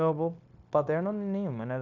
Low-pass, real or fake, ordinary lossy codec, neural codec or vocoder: 7.2 kHz; fake; none; codec, 16 kHz, 0.9 kbps, LongCat-Audio-Codec